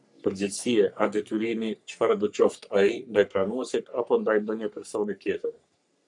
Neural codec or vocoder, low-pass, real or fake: codec, 44.1 kHz, 3.4 kbps, Pupu-Codec; 10.8 kHz; fake